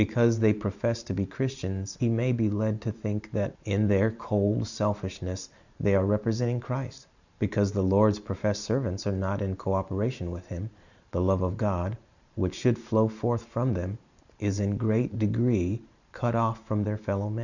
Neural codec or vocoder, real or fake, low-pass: vocoder, 44.1 kHz, 128 mel bands every 512 samples, BigVGAN v2; fake; 7.2 kHz